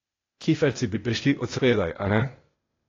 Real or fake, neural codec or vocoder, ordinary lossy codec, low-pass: fake; codec, 16 kHz, 0.8 kbps, ZipCodec; AAC, 32 kbps; 7.2 kHz